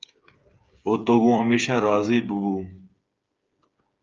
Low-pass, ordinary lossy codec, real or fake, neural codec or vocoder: 7.2 kHz; Opus, 24 kbps; fake; codec, 16 kHz, 8 kbps, FreqCodec, smaller model